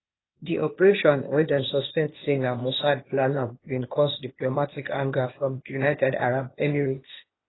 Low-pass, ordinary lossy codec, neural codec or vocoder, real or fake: 7.2 kHz; AAC, 16 kbps; codec, 16 kHz, 0.8 kbps, ZipCodec; fake